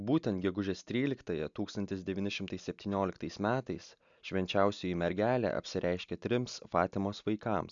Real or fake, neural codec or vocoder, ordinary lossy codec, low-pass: real; none; MP3, 96 kbps; 7.2 kHz